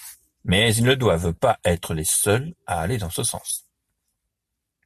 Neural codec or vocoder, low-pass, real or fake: none; 14.4 kHz; real